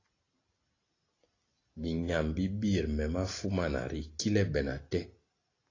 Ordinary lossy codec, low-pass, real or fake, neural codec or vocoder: AAC, 32 kbps; 7.2 kHz; real; none